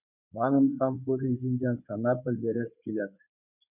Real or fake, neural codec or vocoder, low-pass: fake; codec, 16 kHz in and 24 kHz out, 2.2 kbps, FireRedTTS-2 codec; 3.6 kHz